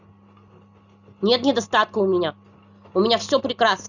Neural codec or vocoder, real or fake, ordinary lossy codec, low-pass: none; real; none; 7.2 kHz